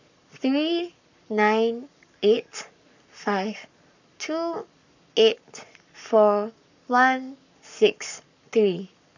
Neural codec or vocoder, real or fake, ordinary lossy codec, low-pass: codec, 44.1 kHz, 7.8 kbps, Pupu-Codec; fake; none; 7.2 kHz